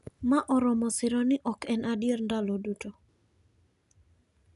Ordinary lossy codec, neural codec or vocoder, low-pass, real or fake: none; none; 10.8 kHz; real